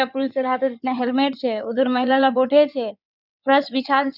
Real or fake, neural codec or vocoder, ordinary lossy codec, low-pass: fake; codec, 16 kHz in and 24 kHz out, 2.2 kbps, FireRedTTS-2 codec; none; 5.4 kHz